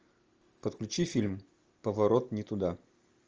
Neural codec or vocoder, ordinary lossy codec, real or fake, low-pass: none; Opus, 24 kbps; real; 7.2 kHz